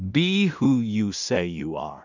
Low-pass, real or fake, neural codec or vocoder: 7.2 kHz; fake; codec, 16 kHz in and 24 kHz out, 0.4 kbps, LongCat-Audio-Codec, two codebook decoder